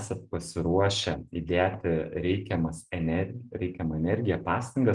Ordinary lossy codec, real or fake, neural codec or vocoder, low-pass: Opus, 24 kbps; real; none; 10.8 kHz